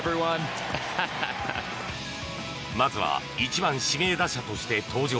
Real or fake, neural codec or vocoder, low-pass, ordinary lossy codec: real; none; none; none